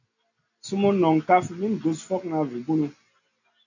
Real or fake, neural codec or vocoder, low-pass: real; none; 7.2 kHz